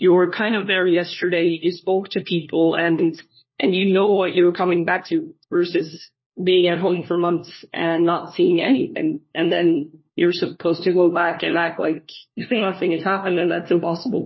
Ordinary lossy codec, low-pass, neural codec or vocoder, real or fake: MP3, 24 kbps; 7.2 kHz; codec, 16 kHz, 1 kbps, FunCodec, trained on LibriTTS, 50 frames a second; fake